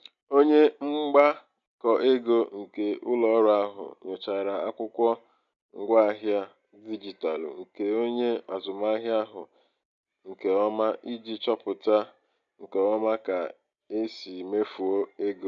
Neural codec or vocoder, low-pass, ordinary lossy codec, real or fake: none; 7.2 kHz; none; real